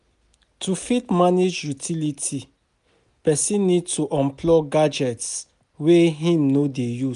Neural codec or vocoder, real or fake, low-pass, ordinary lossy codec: none; real; 10.8 kHz; none